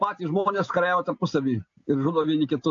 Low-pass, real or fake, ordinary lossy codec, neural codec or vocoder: 7.2 kHz; real; AAC, 48 kbps; none